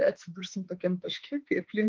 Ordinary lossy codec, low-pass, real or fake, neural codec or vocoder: Opus, 32 kbps; 7.2 kHz; fake; autoencoder, 48 kHz, 32 numbers a frame, DAC-VAE, trained on Japanese speech